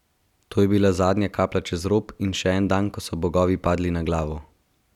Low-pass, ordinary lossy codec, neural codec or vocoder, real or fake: 19.8 kHz; none; none; real